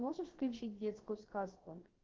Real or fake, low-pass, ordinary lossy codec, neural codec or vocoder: fake; 7.2 kHz; Opus, 16 kbps; codec, 16 kHz, about 1 kbps, DyCAST, with the encoder's durations